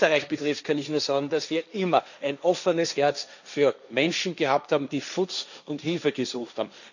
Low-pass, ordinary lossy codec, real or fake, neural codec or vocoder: 7.2 kHz; none; fake; codec, 16 kHz, 1.1 kbps, Voila-Tokenizer